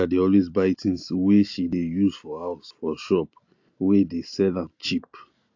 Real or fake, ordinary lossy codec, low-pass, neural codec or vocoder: real; AAC, 48 kbps; 7.2 kHz; none